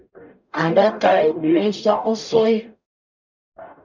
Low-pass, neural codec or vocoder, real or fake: 7.2 kHz; codec, 44.1 kHz, 0.9 kbps, DAC; fake